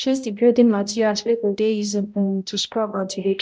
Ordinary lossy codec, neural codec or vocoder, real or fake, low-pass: none; codec, 16 kHz, 0.5 kbps, X-Codec, HuBERT features, trained on balanced general audio; fake; none